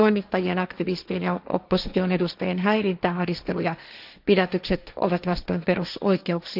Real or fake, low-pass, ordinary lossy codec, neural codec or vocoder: fake; 5.4 kHz; none; codec, 16 kHz, 1.1 kbps, Voila-Tokenizer